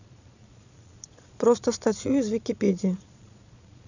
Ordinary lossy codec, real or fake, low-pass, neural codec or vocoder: none; fake; 7.2 kHz; vocoder, 22.05 kHz, 80 mel bands, Vocos